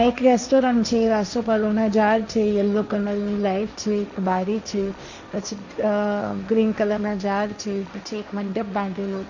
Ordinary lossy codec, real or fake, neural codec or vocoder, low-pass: none; fake; codec, 16 kHz, 1.1 kbps, Voila-Tokenizer; 7.2 kHz